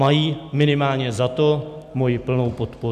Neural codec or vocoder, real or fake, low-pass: none; real; 14.4 kHz